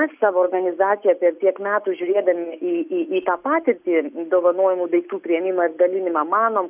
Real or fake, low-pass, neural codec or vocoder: real; 3.6 kHz; none